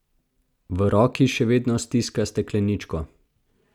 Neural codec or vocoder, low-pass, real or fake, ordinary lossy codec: none; 19.8 kHz; real; none